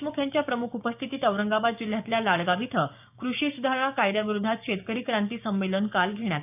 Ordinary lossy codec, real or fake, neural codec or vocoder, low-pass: none; fake; codec, 16 kHz, 6 kbps, DAC; 3.6 kHz